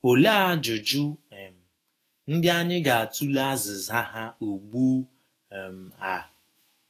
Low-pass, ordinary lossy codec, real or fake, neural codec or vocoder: 14.4 kHz; AAC, 48 kbps; fake; autoencoder, 48 kHz, 128 numbers a frame, DAC-VAE, trained on Japanese speech